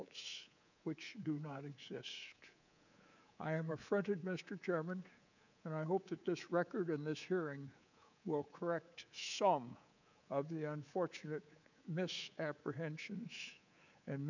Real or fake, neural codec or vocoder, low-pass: fake; codec, 24 kHz, 3.1 kbps, DualCodec; 7.2 kHz